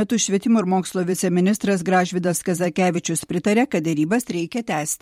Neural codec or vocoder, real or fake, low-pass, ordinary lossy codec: vocoder, 44.1 kHz, 128 mel bands every 512 samples, BigVGAN v2; fake; 19.8 kHz; MP3, 64 kbps